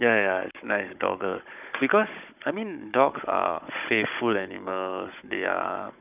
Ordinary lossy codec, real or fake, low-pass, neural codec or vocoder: none; fake; 3.6 kHz; codec, 16 kHz, 16 kbps, FunCodec, trained on Chinese and English, 50 frames a second